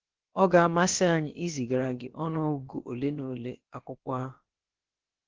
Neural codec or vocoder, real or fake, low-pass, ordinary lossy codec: codec, 16 kHz, about 1 kbps, DyCAST, with the encoder's durations; fake; 7.2 kHz; Opus, 16 kbps